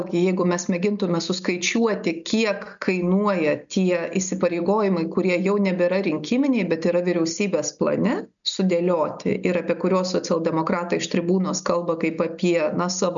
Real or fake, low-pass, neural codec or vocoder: real; 7.2 kHz; none